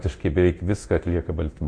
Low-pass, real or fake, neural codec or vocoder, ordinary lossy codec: 9.9 kHz; fake; codec, 24 kHz, 0.9 kbps, DualCodec; MP3, 48 kbps